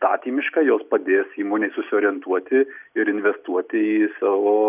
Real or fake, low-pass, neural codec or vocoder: real; 3.6 kHz; none